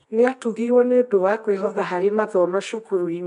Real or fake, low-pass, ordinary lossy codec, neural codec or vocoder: fake; 10.8 kHz; none; codec, 24 kHz, 0.9 kbps, WavTokenizer, medium music audio release